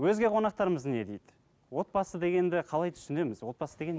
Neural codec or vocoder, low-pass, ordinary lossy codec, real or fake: none; none; none; real